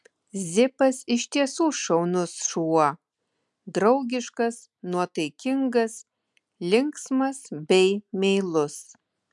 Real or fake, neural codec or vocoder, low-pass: real; none; 10.8 kHz